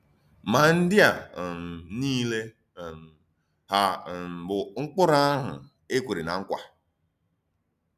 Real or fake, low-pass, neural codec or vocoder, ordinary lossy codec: real; 14.4 kHz; none; none